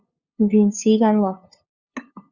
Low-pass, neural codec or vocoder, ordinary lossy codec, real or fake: 7.2 kHz; codec, 16 kHz, 2 kbps, FunCodec, trained on LibriTTS, 25 frames a second; Opus, 64 kbps; fake